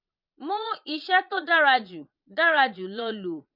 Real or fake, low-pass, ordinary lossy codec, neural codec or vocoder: fake; 5.4 kHz; none; vocoder, 22.05 kHz, 80 mel bands, Vocos